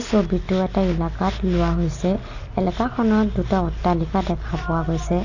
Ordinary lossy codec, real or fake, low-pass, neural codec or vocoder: none; real; 7.2 kHz; none